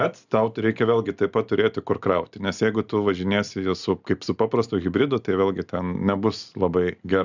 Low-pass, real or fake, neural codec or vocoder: 7.2 kHz; real; none